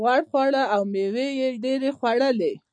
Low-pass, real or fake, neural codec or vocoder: 9.9 kHz; real; none